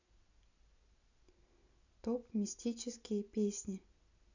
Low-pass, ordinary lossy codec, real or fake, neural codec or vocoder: 7.2 kHz; none; real; none